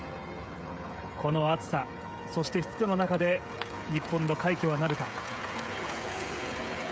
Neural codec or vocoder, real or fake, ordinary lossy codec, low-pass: codec, 16 kHz, 16 kbps, FreqCodec, smaller model; fake; none; none